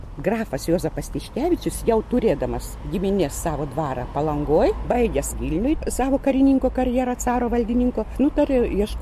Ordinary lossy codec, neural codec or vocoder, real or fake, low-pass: MP3, 64 kbps; none; real; 14.4 kHz